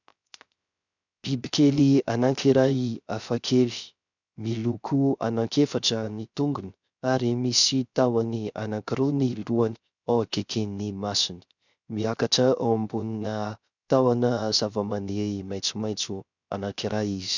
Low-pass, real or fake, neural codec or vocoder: 7.2 kHz; fake; codec, 16 kHz, 0.3 kbps, FocalCodec